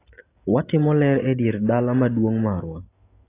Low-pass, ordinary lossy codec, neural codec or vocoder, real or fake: 3.6 kHz; AAC, 24 kbps; none; real